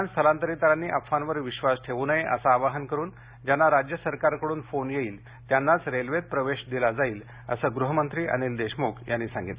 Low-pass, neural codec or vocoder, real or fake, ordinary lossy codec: 3.6 kHz; none; real; Opus, 64 kbps